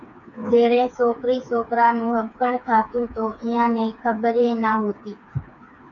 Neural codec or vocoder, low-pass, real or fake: codec, 16 kHz, 4 kbps, FreqCodec, smaller model; 7.2 kHz; fake